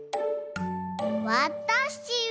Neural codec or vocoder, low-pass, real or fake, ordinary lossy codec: none; none; real; none